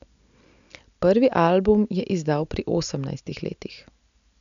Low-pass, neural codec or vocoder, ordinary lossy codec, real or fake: 7.2 kHz; none; MP3, 96 kbps; real